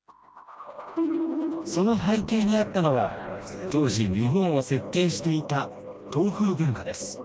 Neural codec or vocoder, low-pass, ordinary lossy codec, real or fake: codec, 16 kHz, 1 kbps, FreqCodec, smaller model; none; none; fake